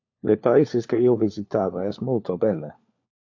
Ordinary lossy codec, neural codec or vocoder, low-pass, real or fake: AAC, 48 kbps; codec, 16 kHz, 4 kbps, FunCodec, trained on LibriTTS, 50 frames a second; 7.2 kHz; fake